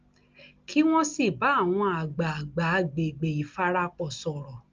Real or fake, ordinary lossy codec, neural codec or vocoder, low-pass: real; Opus, 24 kbps; none; 7.2 kHz